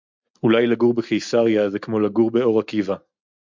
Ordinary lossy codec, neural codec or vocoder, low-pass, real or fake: MP3, 64 kbps; none; 7.2 kHz; real